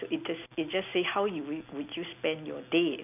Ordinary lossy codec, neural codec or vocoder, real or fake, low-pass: none; none; real; 3.6 kHz